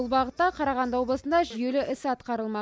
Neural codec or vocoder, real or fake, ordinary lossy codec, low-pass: none; real; none; none